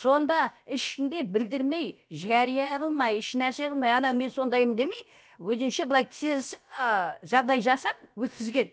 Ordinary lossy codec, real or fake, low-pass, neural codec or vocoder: none; fake; none; codec, 16 kHz, about 1 kbps, DyCAST, with the encoder's durations